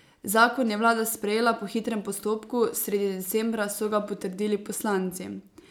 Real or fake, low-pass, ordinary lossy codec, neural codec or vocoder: real; none; none; none